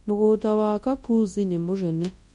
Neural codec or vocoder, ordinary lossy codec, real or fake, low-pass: codec, 24 kHz, 0.9 kbps, WavTokenizer, large speech release; MP3, 48 kbps; fake; 10.8 kHz